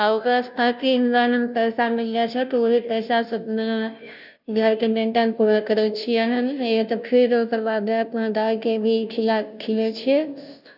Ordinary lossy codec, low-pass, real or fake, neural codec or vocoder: none; 5.4 kHz; fake; codec, 16 kHz, 0.5 kbps, FunCodec, trained on Chinese and English, 25 frames a second